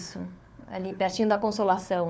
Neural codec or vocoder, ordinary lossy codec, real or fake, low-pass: codec, 16 kHz, 4 kbps, FunCodec, trained on LibriTTS, 50 frames a second; none; fake; none